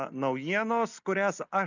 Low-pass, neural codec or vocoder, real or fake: 7.2 kHz; none; real